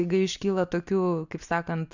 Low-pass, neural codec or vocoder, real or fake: 7.2 kHz; none; real